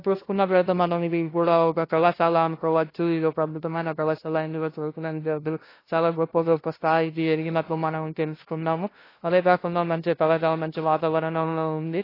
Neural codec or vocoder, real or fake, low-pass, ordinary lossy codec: codec, 16 kHz, 0.5 kbps, FunCodec, trained on LibriTTS, 25 frames a second; fake; 5.4 kHz; AAC, 24 kbps